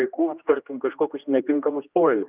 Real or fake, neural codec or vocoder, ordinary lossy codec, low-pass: fake; codec, 16 kHz, 1 kbps, X-Codec, HuBERT features, trained on general audio; Opus, 24 kbps; 3.6 kHz